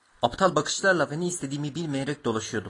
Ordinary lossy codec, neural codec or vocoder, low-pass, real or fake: AAC, 32 kbps; none; 10.8 kHz; real